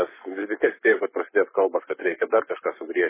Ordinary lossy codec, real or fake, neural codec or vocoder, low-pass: MP3, 16 kbps; fake; codec, 44.1 kHz, 7.8 kbps, Pupu-Codec; 3.6 kHz